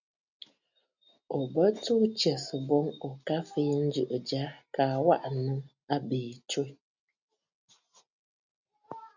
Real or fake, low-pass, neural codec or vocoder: real; 7.2 kHz; none